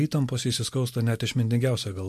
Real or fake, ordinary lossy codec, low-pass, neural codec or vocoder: real; MP3, 64 kbps; 14.4 kHz; none